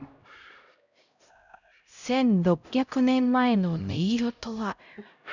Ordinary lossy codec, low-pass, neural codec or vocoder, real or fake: none; 7.2 kHz; codec, 16 kHz, 0.5 kbps, X-Codec, HuBERT features, trained on LibriSpeech; fake